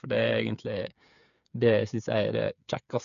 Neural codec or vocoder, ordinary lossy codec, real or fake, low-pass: codec, 16 kHz, 8 kbps, FreqCodec, smaller model; none; fake; 7.2 kHz